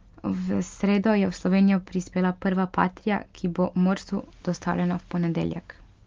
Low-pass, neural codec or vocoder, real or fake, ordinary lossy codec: 7.2 kHz; none; real; Opus, 32 kbps